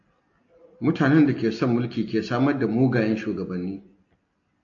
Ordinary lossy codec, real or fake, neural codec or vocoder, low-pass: AAC, 48 kbps; real; none; 7.2 kHz